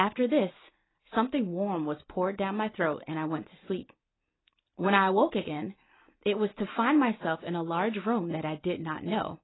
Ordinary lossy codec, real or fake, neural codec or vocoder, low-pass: AAC, 16 kbps; real; none; 7.2 kHz